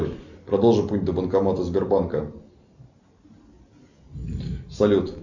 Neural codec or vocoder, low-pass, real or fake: none; 7.2 kHz; real